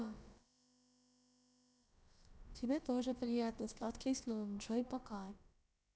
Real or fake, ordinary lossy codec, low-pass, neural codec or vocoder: fake; none; none; codec, 16 kHz, about 1 kbps, DyCAST, with the encoder's durations